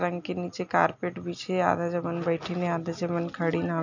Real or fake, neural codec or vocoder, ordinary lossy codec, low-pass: real; none; none; 7.2 kHz